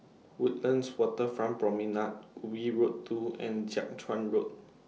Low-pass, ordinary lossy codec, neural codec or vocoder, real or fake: none; none; none; real